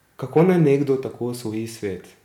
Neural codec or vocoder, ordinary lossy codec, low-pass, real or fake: none; none; 19.8 kHz; real